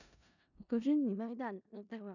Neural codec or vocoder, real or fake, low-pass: codec, 16 kHz in and 24 kHz out, 0.4 kbps, LongCat-Audio-Codec, four codebook decoder; fake; 7.2 kHz